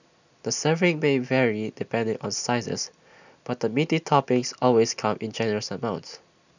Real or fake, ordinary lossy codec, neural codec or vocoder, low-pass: real; none; none; 7.2 kHz